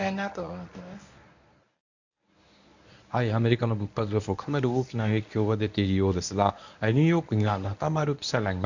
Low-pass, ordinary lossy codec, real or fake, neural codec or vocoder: 7.2 kHz; none; fake; codec, 24 kHz, 0.9 kbps, WavTokenizer, medium speech release version 1